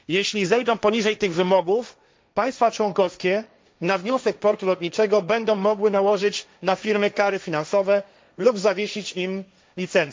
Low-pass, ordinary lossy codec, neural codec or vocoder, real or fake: none; none; codec, 16 kHz, 1.1 kbps, Voila-Tokenizer; fake